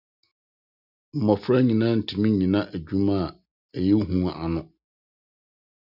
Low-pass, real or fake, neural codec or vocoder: 5.4 kHz; real; none